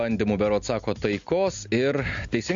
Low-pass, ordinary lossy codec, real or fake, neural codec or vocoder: 7.2 kHz; AAC, 64 kbps; real; none